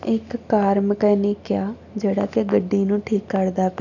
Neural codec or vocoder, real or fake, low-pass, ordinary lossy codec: none; real; 7.2 kHz; none